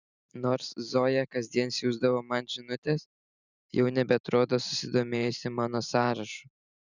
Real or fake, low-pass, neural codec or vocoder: fake; 7.2 kHz; vocoder, 44.1 kHz, 128 mel bands every 512 samples, BigVGAN v2